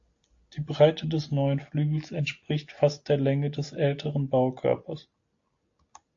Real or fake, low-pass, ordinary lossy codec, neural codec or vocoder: real; 7.2 kHz; AAC, 48 kbps; none